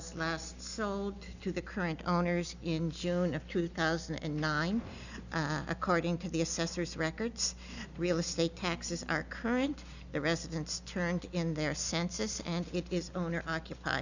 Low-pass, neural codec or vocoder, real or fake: 7.2 kHz; none; real